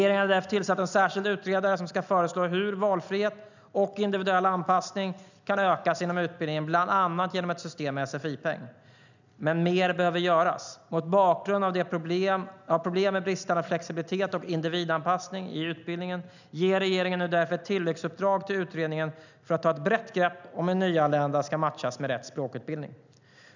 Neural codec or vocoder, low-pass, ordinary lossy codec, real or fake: none; 7.2 kHz; none; real